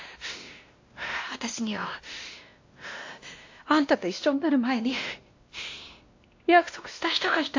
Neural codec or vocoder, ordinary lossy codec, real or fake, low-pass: codec, 16 kHz, 1 kbps, X-Codec, WavLM features, trained on Multilingual LibriSpeech; AAC, 48 kbps; fake; 7.2 kHz